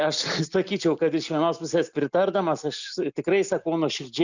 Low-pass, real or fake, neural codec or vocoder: 7.2 kHz; real; none